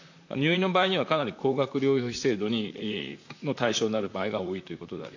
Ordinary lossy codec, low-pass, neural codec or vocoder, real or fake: AAC, 48 kbps; 7.2 kHz; vocoder, 44.1 kHz, 128 mel bands, Pupu-Vocoder; fake